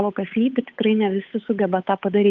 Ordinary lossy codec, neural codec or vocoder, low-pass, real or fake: Opus, 32 kbps; codec, 16 kHz, 8 kbps, FunCodec, trained on Chinese and English, 25 frames a second; 7.2 kHz; fake